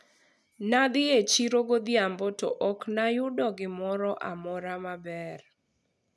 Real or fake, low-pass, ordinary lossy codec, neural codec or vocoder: real; none; none; none